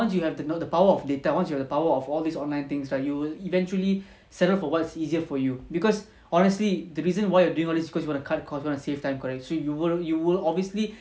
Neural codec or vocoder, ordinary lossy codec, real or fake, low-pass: none; none; real; none